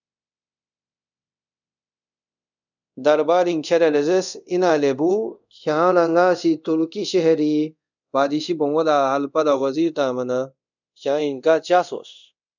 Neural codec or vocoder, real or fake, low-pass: codec, 24 kHz, 0.5 kbps, DualCodec; fake; 7.2 kHz